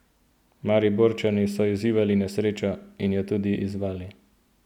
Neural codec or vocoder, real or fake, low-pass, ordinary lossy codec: none; real; 19.8 kHz; none